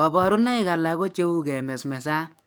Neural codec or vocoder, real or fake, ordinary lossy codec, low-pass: codec, 44.1 kHz, 7.8 kbps, DAC; fake; none; none